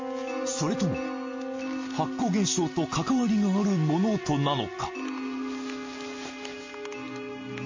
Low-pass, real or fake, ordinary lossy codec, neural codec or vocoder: 7.2 kHz; real; MP3, 32 kbps; none